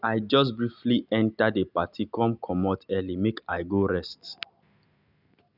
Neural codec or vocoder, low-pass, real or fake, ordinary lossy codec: none; 5.4 kHz; real; none